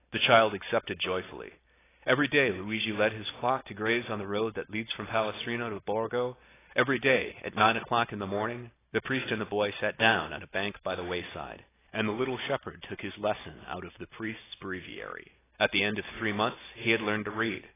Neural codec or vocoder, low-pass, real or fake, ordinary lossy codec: none; 3.6 kHz; real; AAC, 16 kbps